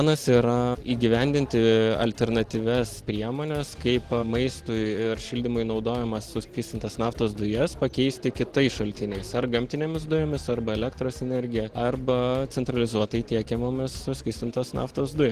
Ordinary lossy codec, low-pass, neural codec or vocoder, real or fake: Opus, 16 kbps; 14.4 kHz; none; real